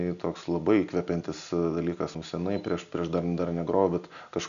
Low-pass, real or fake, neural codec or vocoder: 7.2 kHz; real; none